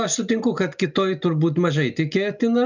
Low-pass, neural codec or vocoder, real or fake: 7.2 kHz; none; real